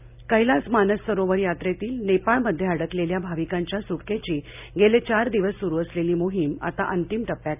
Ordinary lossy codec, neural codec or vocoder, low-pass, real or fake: none; none; 3.6 kHz; real